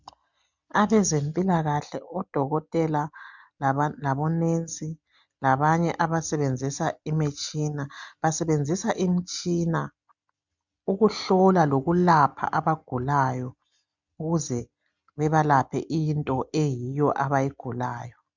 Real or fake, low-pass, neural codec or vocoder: real; 7.2 kHz; none